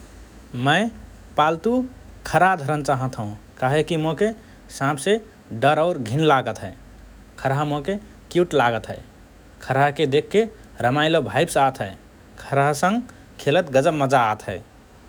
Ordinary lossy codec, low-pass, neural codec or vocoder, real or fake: none; none; autoencoder, 48 kHz, 128 numbers a frame, DAC-VAE, trained on Japanese speech; fake